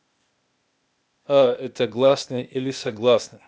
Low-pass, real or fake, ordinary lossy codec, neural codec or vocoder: none; fake; none; codec, 16 kHz, 0.8 kbps, ZipCodec